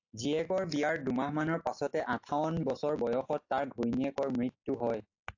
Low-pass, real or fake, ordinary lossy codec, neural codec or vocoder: 7.2 kHz; real; AAC, 48 kbps; none